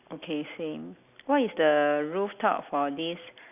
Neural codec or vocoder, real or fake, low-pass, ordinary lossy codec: none; real; 3.6 kHz; none